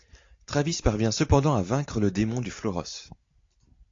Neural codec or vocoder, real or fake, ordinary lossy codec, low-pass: none; real; AAC, 48 kbps; 7.2 kHz